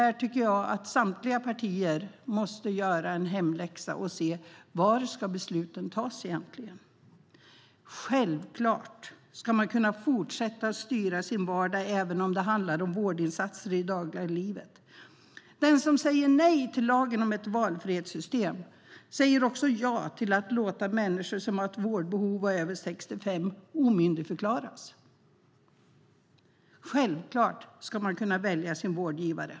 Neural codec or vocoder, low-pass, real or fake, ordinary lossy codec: none; none; real; none